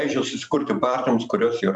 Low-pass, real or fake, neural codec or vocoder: 10.8 kHz; fake; vocoder, 44.1 kHz, 128 mel bands every 256 samples, BigVGAN v2